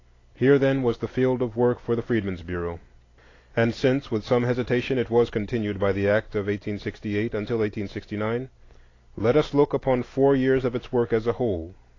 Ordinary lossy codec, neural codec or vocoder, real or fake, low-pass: AAC, 32 kbps; none; real; 7.2 kHz